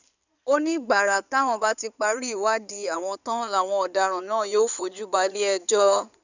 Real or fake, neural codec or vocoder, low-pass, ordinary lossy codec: fake; codec, 16 kHz in and 24 kHz out, 2.2 kbps, FireRedTTS-2 codec; 7.2 kHz; none